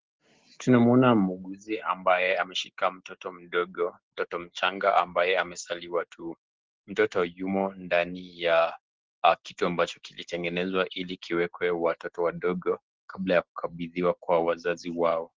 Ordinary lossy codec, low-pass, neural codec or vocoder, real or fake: Opus, 16 kbps; 7.2 kHz; none; real